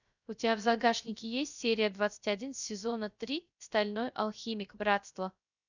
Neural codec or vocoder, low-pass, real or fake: codec, 16 kHz, 0.3 kbps, FocalCodec; 7.2 kHz; fake